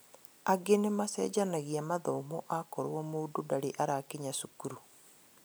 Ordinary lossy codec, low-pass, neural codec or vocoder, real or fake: none; none; none; real